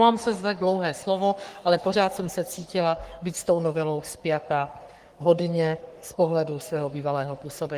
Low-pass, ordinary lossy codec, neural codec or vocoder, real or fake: 14.4 kHz; Opus, 24 kbps; codec, 44.1 kHz, 3.4 kbps, Pupu-Codec; fake